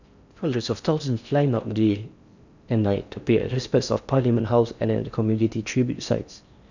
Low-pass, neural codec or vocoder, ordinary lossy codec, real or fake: 7.2 kHz; codec, 16 kHz in and 24 kHz out, 0.6 kbps, FocalCodec, streaming, 4096 codes; none; fake